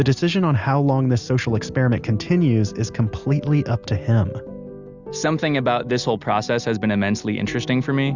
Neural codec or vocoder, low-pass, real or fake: none; 7.2 kHz; real